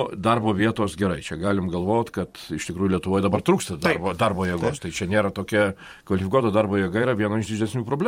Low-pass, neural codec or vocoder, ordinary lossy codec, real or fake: 19.8 kHz; vocoder, 44.1 kHz, 128 mel bands every 512 samples, BigVGAN v2; MP3, 64 kbps; fake